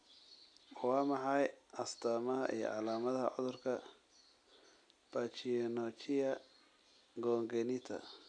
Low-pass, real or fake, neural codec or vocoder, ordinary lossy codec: 9.9 kHz; real; none; none